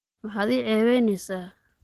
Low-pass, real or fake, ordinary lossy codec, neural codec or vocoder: 14.4 kHz; real; Opus, 16 kbps; none